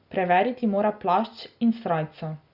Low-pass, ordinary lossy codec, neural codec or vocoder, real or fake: 5.4 kHz; Opus, 64 kbps; none; real